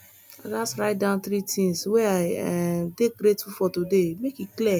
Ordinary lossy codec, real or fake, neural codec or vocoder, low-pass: none; real; none; none